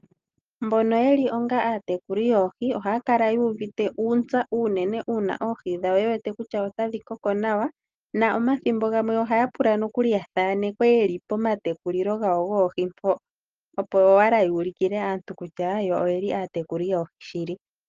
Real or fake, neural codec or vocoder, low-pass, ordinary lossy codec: real; none; 7.2 kHz; Opus, 24 kbps